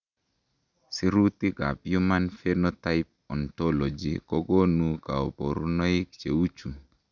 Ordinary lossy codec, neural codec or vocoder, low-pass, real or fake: none; none; 7.2 kHz; real